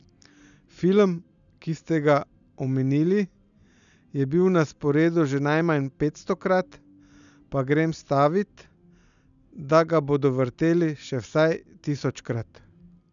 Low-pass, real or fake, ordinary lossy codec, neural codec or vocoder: 7.2 kHz; real; none; none